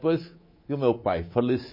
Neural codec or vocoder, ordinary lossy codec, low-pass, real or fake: codec, 24 kHz, 3.1 kbps, DualCodec; MP3, 24 kbps; 5.4 kHz; fake